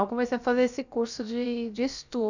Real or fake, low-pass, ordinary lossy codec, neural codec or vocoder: fake; 7.2 kHz; none; codec, 16 kHz, about 1 kbps, DyCAST, with the encoder's durations